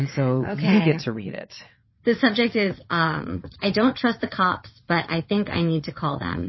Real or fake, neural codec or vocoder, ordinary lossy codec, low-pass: fake; vocoder, 22.05 kHz, 80 mel bands, WaveNeXt; MP3, 24 kbps; 7.2 kHz